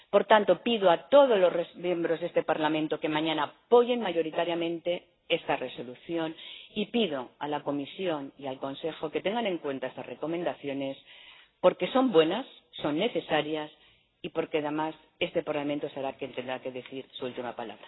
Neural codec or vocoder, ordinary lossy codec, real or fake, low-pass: none; AAC, 16 kbps; real; 7.2 kHz